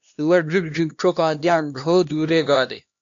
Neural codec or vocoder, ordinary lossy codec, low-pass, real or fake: codec, 16 kHz, 1 kbps, X-Codec, HuBERT features, trained on LibriSpeech; AAC, 64 kbps; 7.2 kHz; fake